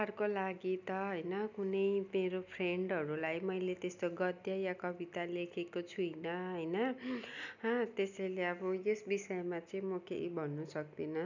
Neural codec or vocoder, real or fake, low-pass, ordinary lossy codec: none; real; 7.2 kHz; none